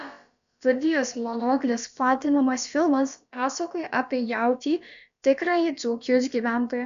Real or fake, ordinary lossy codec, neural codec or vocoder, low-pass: fake; AAC, 96 kbps; codec, 16 kHz, about 1 kbps, DyCAST, with the encoder's durations; 7.2 kHz